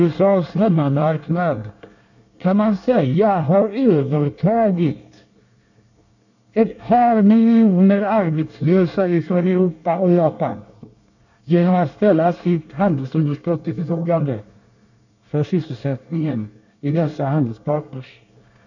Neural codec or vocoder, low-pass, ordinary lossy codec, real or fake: codec, 24 kHz, 1 kbps, SNAC; 7.2 kHz; none; fake